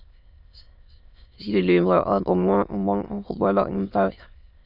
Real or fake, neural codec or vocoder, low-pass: fake; autoencoder, 22.05 kHz, a latent of 192 numbers a frame, VITS, trained on many speakers; 5.4 kHz